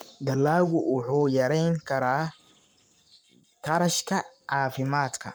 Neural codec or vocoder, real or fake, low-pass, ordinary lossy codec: codec, 44.1 kHz, 7.8 kbps, Pupu-Codec; fake; none; none